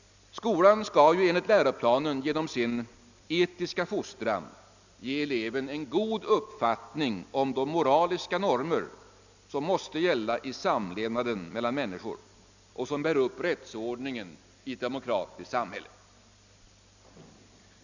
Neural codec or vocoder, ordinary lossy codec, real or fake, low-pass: none; none; real; 7.2 kHz